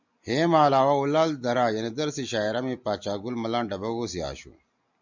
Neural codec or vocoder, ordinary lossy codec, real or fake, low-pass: none; MP3, 64 kbps; real; 7.2 kHz